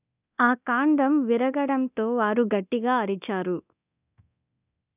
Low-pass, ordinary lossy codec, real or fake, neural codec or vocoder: 3.6 kHz; none; fake; codec, 24 kHz, 0.9 kbps, DualCodec